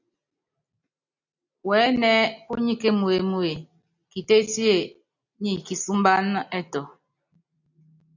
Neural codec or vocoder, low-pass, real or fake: none; 7.2 kHz; real